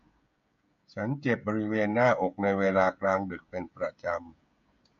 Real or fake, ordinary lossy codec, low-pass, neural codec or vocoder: fake; MP3, 48 kbps; 7.2 kHz; codec, 16 kHz, 16 kbps, FreqCodec, smaller model